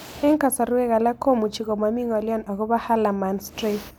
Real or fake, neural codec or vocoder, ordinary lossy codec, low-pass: real; none; none; none